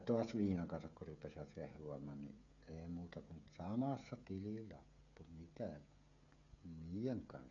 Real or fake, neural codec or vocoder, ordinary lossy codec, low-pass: fake; codec, 16 kHz, 16 kbps, FreqCodec, smaller model; none; 7.2 kHz